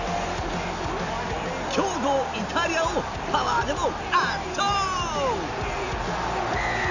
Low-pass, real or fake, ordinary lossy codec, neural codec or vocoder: 7.2 kHz; real; none; none